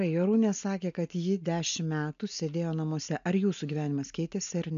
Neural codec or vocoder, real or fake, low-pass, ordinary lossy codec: none; real; 7.2 kHz; AAC, 96 kbps